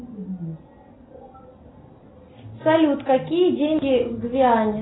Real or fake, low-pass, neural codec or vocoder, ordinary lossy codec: real; 7.2 kHz; none; AAC, 16 kbps